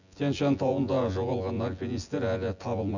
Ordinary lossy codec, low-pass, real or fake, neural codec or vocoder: none; 7.2 kHz; fake; vocoder, 24 kHz, 100 mel bands, Vocos